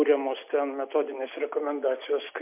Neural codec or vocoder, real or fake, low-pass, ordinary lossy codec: none; real; 3.6 kHz; MP3, 32 kbps